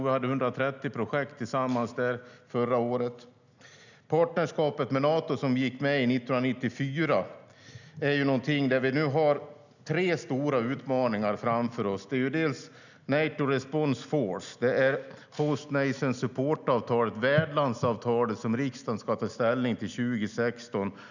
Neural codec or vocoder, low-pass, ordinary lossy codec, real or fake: none; 7.2 kHz; none; real